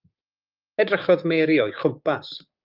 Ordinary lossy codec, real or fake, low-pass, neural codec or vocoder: Opus, 24 kbps; fake; 5.4 kHz; codec, 16 kHz, 6 kbps, DAC